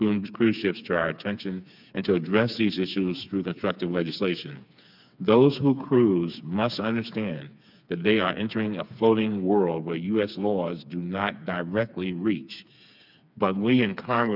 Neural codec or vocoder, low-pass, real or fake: codec, 16 kHz, 4 kbps, FreqCodec, smaller model; 5.4 kHz; fake